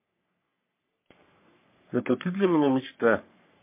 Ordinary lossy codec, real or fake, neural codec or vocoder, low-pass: MP3, 24 kbps; fake; codec, 44.1 kHz, 3.4 kbps, Pupu-Codec; 3.6 kHz